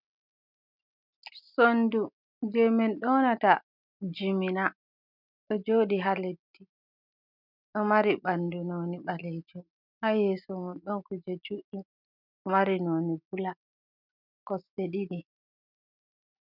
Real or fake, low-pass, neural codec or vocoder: real; 5.4 kHz; none